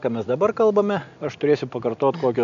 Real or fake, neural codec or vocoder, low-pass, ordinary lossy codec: real; none; 7.2 kHz; MP3, 96 kbps